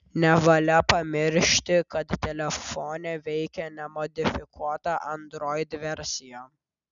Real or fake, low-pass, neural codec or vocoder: real; 7.2 kHz; none